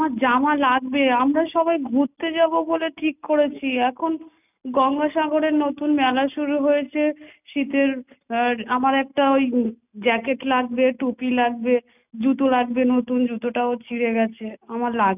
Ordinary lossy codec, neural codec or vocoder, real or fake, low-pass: none; none; real; 3.6 kHz